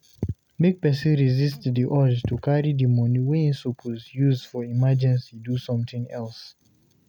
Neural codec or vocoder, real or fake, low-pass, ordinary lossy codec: none; real; 19.8 kHz; none